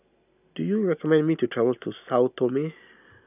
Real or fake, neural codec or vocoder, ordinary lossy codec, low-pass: real; none; none; 3.6 kHz